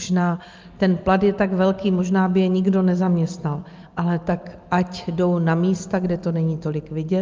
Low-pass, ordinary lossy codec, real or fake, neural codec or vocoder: 7.2 kHz; Opus, 24 kbps; real; none